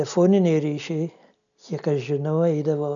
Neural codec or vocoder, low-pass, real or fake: none; 7.2 kHz; real